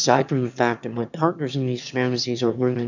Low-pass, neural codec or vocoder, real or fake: 7.2 kHz; autoencoder, 22.05 kHz, a latent of 192 numbers a frame, VITS, trained on one speaker; fake